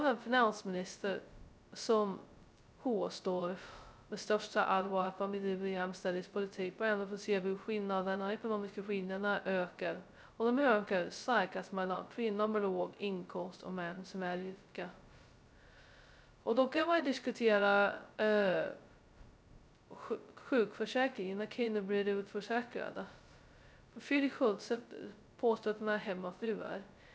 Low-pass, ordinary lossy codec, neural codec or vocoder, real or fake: none; none; codec, 16 kHz, 0.2 kbps, FocalCodec; fake